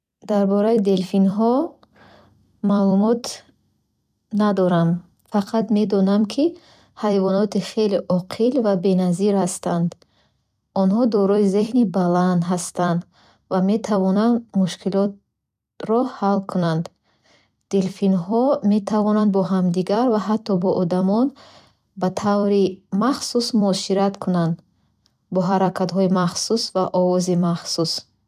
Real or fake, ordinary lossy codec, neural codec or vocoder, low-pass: fake; none; vocoder, 44.1 kHz, 128 mel bands every 256 samples, BigVGAN v2; 14.4 kHz